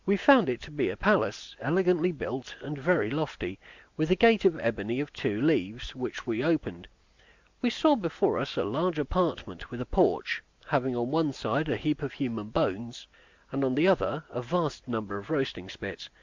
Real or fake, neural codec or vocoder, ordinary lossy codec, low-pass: real; none; MP3, 64 kbps; 7.2 kHz